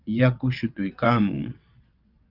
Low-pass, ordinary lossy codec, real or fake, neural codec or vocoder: 5.4 kHz; Opus, 24 kbps; fake; vocoder, 22.05 kHz, 80 mel bands, WaveNeXt